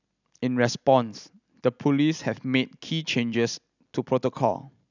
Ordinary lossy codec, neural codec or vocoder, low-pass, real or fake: none; none; 7.2 kHz; real